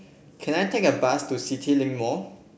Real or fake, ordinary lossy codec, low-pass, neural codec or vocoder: real; none; none; none